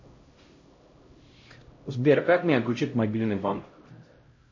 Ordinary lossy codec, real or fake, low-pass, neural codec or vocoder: MP3, 32 kbps; fake; 7.2 kHz; codec, 16 kHz, 0.5 kbps, X-Codec, HuBERT features, trained on LibriSpeech